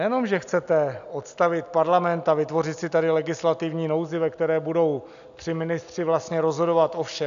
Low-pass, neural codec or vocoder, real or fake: 7.2 kHz; none; real